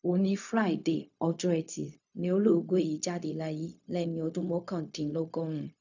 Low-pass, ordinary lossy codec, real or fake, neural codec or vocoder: 7.2 kHz; none; fake; codec, 16 kHz, 0.4 kbps, LongCat-Audio-Codec